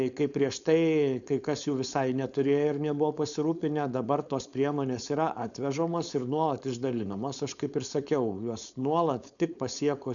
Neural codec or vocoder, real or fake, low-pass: codec, 16 kHz, 4.8 kbps, FACodec; fake; 7.2 kHz